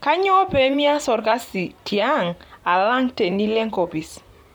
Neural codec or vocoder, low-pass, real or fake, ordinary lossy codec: vocoder, 44.1 kHz, 128 mel bands, Pupu-Vocoder; none; fake; none